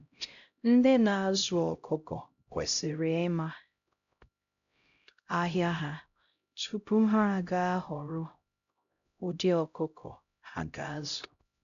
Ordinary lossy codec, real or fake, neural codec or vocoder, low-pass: none; fake; codec, 16 kHz, 0.5 kbps, X-Codec, HuBERT features, trained on LibriSpeech; 7.2 kHz